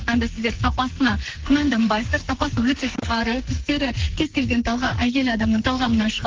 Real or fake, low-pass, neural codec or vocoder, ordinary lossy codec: fake; 7.2 kHz; codec, 44.1 kHz, 2.6 kbps, SNAC; Opus, 16 kbps